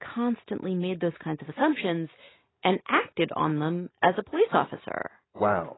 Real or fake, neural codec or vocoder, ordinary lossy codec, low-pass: real; none; AAC, 16 kbps; 7.2 kHz